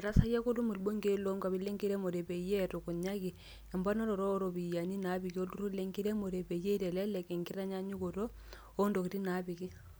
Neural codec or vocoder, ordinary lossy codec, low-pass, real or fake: none; none; none; real